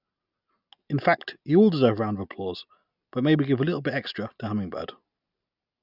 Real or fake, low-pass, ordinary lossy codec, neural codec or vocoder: real; 5.4 kHz; none; none